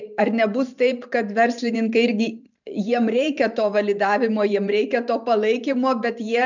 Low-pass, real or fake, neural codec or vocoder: 7.2 kHz; fake; vocoder, 44.1 kHz, 128 mel bands every 512 samples, BigVGAN v2